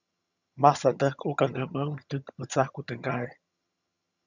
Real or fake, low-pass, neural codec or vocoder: fake; 7.2 kHz; vocoder, 22.05 kHz, 80 mel bands, HiFi-GAN